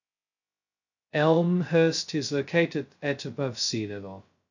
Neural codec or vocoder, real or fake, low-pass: codec, 16 kHz, 0.2 kbps, FocalCodec; fake; 7.2 kHz